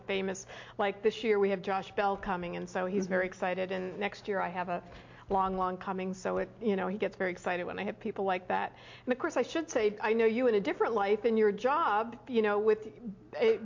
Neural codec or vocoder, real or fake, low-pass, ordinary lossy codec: none; real; 7.2 kHz; MP3, 48 kbps